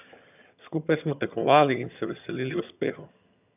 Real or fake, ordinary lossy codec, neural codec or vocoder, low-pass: fake; none; vocoder, 22.05 kHz, 80 mel bands, HiFi-GAN; 3.6 kHz